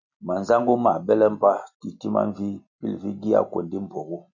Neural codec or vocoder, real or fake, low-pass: none; real; 7.2 kHz